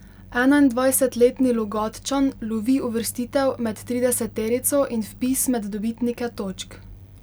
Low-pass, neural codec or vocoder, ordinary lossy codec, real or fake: none; none; none; real